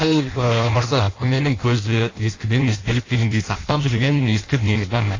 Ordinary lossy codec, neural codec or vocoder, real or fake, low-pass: AAC, 32 kbps; codec, 16 kHz in and 24 kHz out, 0.6 kbps, FireRedTTS-2 codec; fake; 7.2 kHz